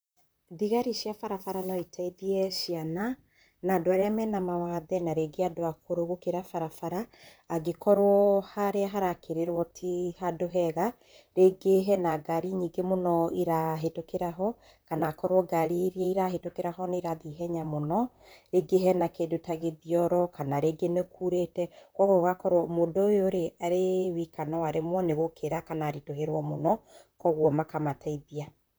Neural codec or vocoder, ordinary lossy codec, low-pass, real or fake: vocoder, 44.1 kHz, 128 mel bands, Pupu-Vocoder; none; none; fake